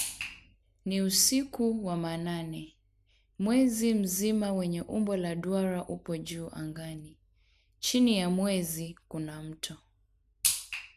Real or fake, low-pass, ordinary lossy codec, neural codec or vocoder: real; 14.4 kHz; none; none